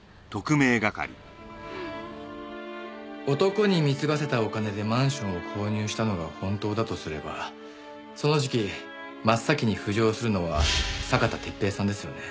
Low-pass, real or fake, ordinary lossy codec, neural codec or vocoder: none; real; none; none